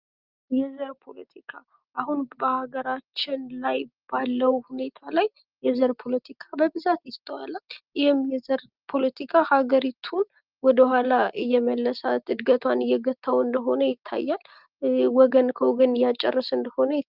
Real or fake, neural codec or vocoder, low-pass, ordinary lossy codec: real; none; 5.4 kHz; Opus, 32 kbps